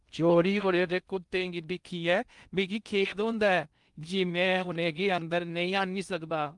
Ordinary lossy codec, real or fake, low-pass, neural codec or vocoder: Opus, 32 kbps; fake; 10.8 kHz; codec, 16 kHz in and 24 kHz out, 0.6 kbps, FocalCodec, streaming, 2048 codes